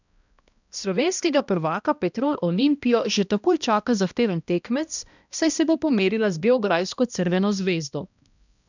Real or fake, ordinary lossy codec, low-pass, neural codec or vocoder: fake; none; 7.2 kHz; codec, 16 kHz, 1 kbps, X-Codec, HuBERT features, trained on balanced general audio